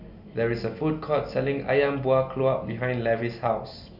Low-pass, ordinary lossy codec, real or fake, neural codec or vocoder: 5.4 kHz; none; real; none